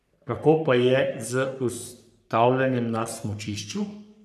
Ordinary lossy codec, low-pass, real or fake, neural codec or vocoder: none; 14.4 kHz; fake; codec, 44.1 kHz, 3.4 kbps, Pupu-Codec